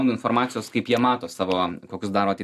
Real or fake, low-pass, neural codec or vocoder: real; 14.4 kHz; none